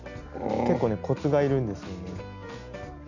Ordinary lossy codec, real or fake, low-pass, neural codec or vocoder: none; real; 7.2 kHz; none